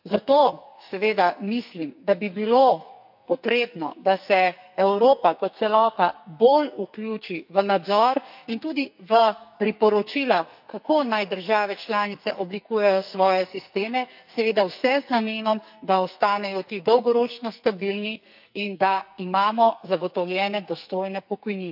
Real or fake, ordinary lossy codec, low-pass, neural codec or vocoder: fake; none; 5.4 kHz; codec, 44.1 kHz, 2.6 kbps, SNAC